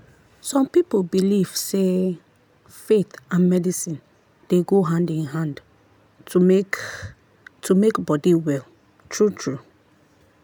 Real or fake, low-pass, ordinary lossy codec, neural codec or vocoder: real; none; none; none